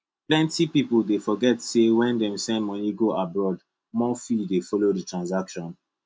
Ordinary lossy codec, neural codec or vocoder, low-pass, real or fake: none; none; none; real